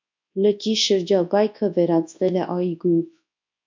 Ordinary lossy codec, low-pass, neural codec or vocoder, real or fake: MP3, 48 kbps; 7.2 kHz; codec, 24 kHz, 0.9 kbps, WavTokenizer, large speech release; fake